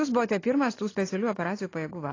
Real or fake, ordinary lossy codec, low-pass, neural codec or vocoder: real; AAC, 32 kbps; 7.2 kHz; none